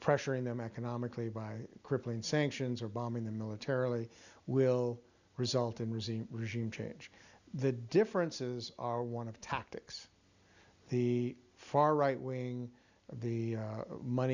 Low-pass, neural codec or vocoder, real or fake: 7.2 kHz; none; real